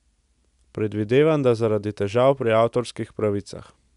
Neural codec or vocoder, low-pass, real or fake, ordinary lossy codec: none; 10.8 kHz; real; none